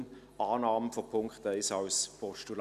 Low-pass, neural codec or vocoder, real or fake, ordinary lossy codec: 14.4 kHz; none; real; none